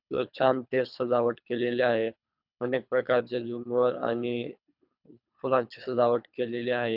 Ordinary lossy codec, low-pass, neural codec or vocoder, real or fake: none; 5.4 kHz; codec, 24 kHz, 3 kbps, HILCodec; fake